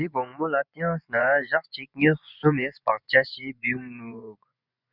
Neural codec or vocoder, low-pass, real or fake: vocoder, 24 kHz, 100 mel bands, Vocos; 5.4 kHz; fake